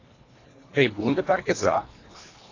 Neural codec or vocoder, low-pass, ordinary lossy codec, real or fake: codec, 24 kHz, 1.5 kbps, HILCodec; 7.2 kHz; AAC, 32 kbps; fake